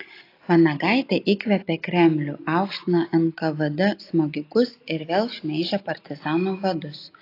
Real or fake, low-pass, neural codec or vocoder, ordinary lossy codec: real; 5.4 kHz; none; AAC, 32 kbps